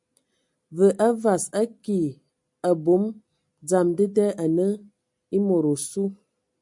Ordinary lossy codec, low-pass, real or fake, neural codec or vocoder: MP3, 96 kbps; 10.8 kHz; real; none